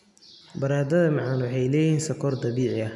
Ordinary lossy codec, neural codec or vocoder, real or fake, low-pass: none; none; real; 10.8 kHz